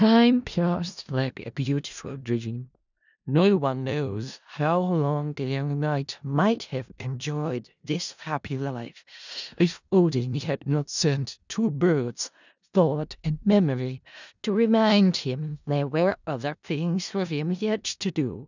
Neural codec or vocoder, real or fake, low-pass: codec, 16 kHz in and 24 kHz out, 0.4 kbps, LongCat-Audio-Codec, four codebook decoder; fake; 7.2 kHz